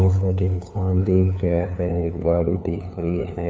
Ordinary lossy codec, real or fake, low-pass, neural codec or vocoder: none; fake; none; codec, 16 kHz, 2 kbps, FunCodec, trained on LibriTTS, 25 frames a second